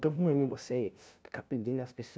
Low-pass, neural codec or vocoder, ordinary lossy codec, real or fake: none; codec, 16 kHz, 0.5 kbps, FunCodec, trained on LibriTTS, 25 frames a second; none; fake